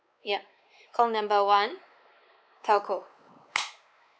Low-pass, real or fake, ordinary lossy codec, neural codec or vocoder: none; fake; none; codec, 16 kHz, 4 kbps, X-Codec, WavLM features, trained on Multilingual LibriSpeech